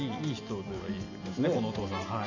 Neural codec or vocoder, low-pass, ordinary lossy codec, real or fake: none; 7.2 kHz; none; real